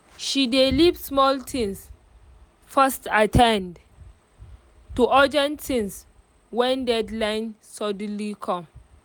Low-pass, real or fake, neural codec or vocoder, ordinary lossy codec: none; real; none; none